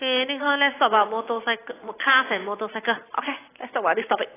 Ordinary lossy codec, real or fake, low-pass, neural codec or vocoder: AAC, 16 kbps; real; 3.6 kHz; none